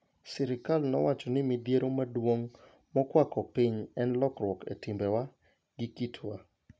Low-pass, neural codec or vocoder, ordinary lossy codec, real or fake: none; none; none; real